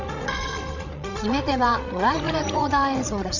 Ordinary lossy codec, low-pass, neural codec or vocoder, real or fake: none; 7.2 kHz; codec, 16 kHz, 16 kbps, FreqCodec, larger model; fake